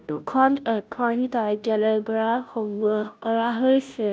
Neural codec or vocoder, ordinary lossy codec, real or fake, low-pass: codec, 16 kHz, 0.5 kbps, FunCodec, trained on Chinese and English, 25 frames a second; none; fake; none